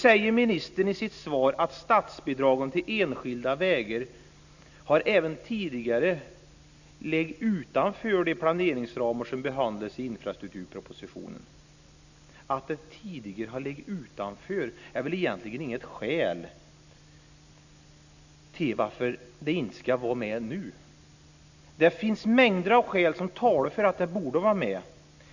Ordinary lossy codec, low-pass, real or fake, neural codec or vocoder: none; 7.2 kHz; real; none